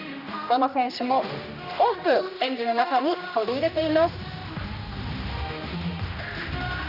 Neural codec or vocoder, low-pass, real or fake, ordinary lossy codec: codec, 16 kHz, 1 kbps, X-Codec, HuBERT features, trained on general audio; 5.4 kHz; fake; AAC, 48 kbps